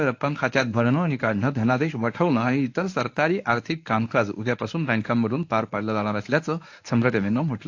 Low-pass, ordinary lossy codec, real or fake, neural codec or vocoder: 7.2 kHz; AAC, 48 kbps; fake; codec, 24 kHz, 0.9 kbps, WavTokenizer, medium speech release version 1